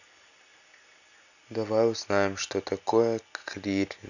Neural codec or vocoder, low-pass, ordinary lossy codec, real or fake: none; 7.2 kHz; none; real